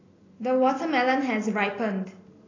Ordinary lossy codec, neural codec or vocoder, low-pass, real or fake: AAC, 32 kbps; none; 7.2 kHz; real